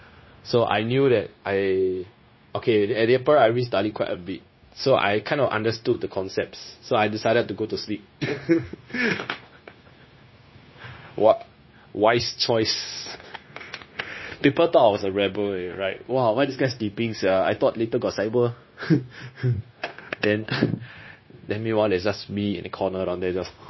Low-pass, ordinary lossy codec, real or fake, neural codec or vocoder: 7.2 kHz; MP3, 24 kbps; fake; codec, 16 kHz, 0.9 kbps, LongCat-Audio-Codec